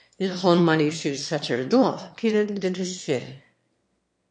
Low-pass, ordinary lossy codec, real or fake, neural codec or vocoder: 9.9 kHz; MP3, 48 kbps; fake; autoencoder, 22.05 kHz, a latent of 192 numbers a frame, VITS, trained on one speaker